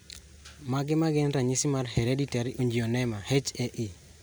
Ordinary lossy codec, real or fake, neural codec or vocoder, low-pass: none; real; none; none